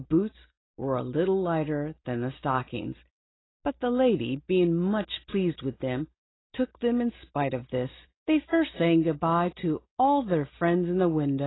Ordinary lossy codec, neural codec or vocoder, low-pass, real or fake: AAC, 16 kbps; none; 7.2 kHz; real